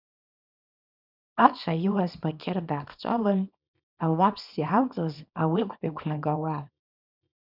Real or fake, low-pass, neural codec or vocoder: fake; 5.4 kHz; codec, 24 kHz, 0.9 kbps, WavTokenizer, small release